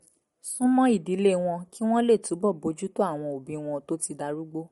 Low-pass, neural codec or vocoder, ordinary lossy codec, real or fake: 19.8 kHz; none; MP3, 48 kbps; real